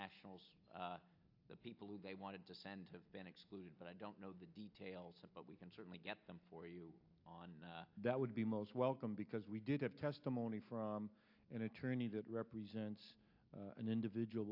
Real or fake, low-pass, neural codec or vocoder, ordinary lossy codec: real; 5.4 kHz; none; AAC, 48 kbps